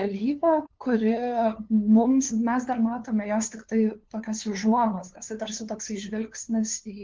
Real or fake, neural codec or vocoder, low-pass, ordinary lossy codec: fake; codec, 16 kHz, 2 kbps, FunCodec, trained on LibriTTS, 25 frames a second; 7.2 kHz; Opus, 16 kbps